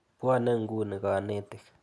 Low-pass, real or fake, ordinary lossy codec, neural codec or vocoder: none; real; none; none